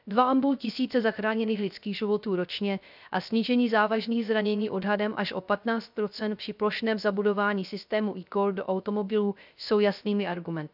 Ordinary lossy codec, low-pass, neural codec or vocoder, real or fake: none; 5.4 kHz; codec, 16 kHz, 0.3 kbps, FocalCodec; fake